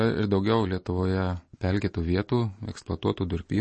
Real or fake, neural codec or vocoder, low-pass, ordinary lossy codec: real; none; 9.9 kHz; MP3, 32 kbps